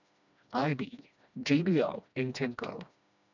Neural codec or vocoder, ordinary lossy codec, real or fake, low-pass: codec, 16 kHz, 1 kbps, FreqCodec, smaller model; none; fake; 7.2 kHz